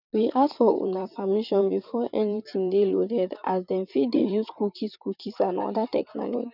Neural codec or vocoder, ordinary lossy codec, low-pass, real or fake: vocoder, 44.1 kHz, 128 mel bands, Pupu-Vocoder; none; 5.4 kHz; fake